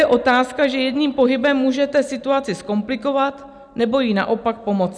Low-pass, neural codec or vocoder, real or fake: 9.9 kHz; none; real